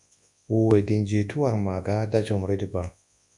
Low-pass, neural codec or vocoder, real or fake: 10.8 kHz; codec, 24 kHz, 0.9 kbps, WavTokenizer, large speech release; fake